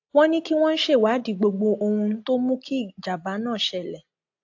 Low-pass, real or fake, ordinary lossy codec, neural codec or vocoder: 7.2 kHz; real; AAC, 48 kbps; none